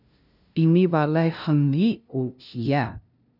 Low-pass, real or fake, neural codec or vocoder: 5.4 kHz; fake; codec, 16 kHz, 0.5 kbps, FunCodec, trained on LibriTTS, 25 frames a second